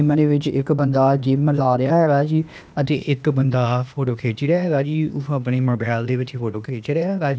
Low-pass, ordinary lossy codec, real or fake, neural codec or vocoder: none; none; fake; codec, 16 kHz, 0.8 kbps, ZipCodec